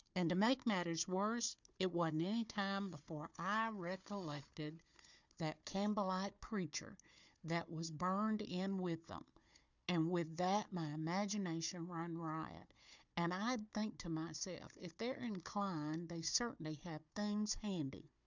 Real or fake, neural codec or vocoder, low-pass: fake; codec, 16 kHz, 4 kbps, FunCodec, trained on Chinese and English, 50 frames a second; 7.2 kHz